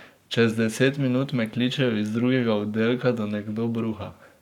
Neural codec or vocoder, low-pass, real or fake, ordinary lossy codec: codec, 44.1 kHz, 7.8 kbps, Pupu-Codec; 19.8 kHz; fake; none